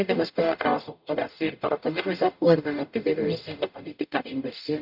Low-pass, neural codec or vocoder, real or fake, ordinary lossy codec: 5.4 kHz; codec, 44.1 kHz, 0.9 kbps, DAC; fake; none